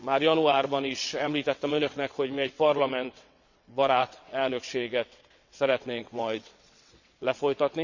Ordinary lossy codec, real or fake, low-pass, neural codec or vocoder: none; fake; 7.2 kHz; vocoder, 22.05 kHz, 80 mel bands, WaveNeXt